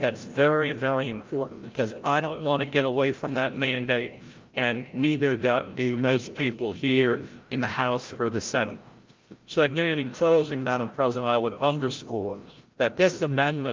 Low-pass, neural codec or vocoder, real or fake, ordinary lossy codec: 7.2 kHz; codec, 16 kHz, 0.5 kbps, FreqCodec, larger model; fake; Opus, 24 kbps